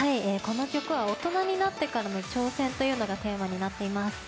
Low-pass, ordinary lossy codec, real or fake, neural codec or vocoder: none; none; real; none